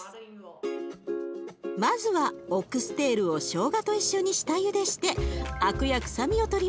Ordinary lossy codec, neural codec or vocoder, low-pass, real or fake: none; none; none; real